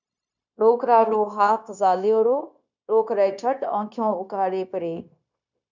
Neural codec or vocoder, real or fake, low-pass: codec, 16 kHz, 0.9 kbps, LongCat-Audio-Codec; fake; 7.2 kHz